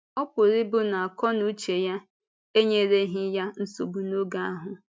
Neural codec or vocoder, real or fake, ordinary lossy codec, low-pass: none; real; none; none